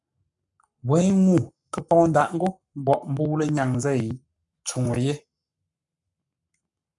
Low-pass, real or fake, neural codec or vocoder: 10.8 kHz; fake; codec, 44.1 kHz, 7.8 kbps, Pupu-Codec